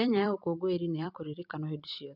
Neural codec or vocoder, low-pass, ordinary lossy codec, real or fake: vocoder, 44.1 kHz, 128 mel bands every 256 samples, BigVGAN v2; 5.4 kHz; none; fake